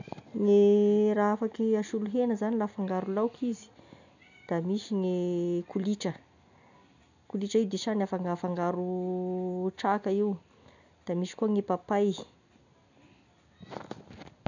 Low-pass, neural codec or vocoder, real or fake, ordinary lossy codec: 7.2 kHz; none; real; none